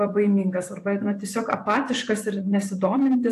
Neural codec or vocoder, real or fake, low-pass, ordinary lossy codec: vocoder, 44.1 kHz, 128 mel bands every 512 samples, BigVGAN v2; fake; 14.4 kHz; AAC, 64 kbps